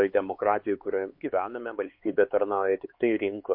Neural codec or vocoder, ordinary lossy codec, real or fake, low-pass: codec, 16 kHz, 4 kbps, X-Codec, HuBERT features, trained on LibriSpeech; MP3, 32 kbps; fake; 5.4 kHz